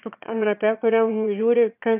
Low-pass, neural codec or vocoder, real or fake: 3.6 kHz; autoencoder, 22.05 kHz, a latent of 192 numbers a frame, VITS, trained on one speaker; fake